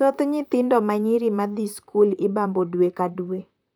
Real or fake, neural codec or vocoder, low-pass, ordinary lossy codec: fake; vocoder, 44.1 kHz, 128 mel bands, Pupu-Vocoder; none; none